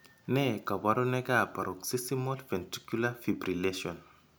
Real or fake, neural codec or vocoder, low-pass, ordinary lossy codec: real; none; none; none